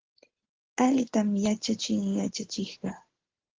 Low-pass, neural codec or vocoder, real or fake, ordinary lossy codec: 7.2 kHz; none; real; Opus, 16 kbps